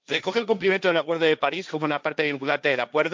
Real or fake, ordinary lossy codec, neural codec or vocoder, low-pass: fake; none; codec, 16 kHz, 1.1 kbps, Voila-Tokenizer; none